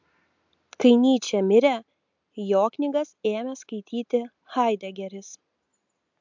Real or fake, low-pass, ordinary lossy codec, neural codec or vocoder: real; 7.2 kHz; MP3, 64 kbps; none